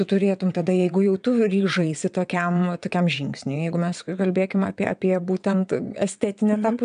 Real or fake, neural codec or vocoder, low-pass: fake; vocoder, 22.05 kHz, 80 mel bands, WaveNeXt; 9.9 kHz